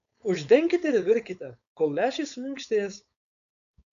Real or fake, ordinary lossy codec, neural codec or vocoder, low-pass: fake; AAC, 96 kbps; codec, 16 kHz, 4.8 kbps, FACodec; 7.2 kHz